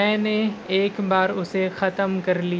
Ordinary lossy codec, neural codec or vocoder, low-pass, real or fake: none; none; none; real